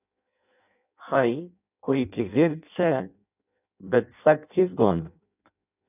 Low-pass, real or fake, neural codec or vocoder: 3.6 kHz; fake; codec, 16 kHz in and 24 kHz out, 0.6 kbps, FireRedTTS-2 codec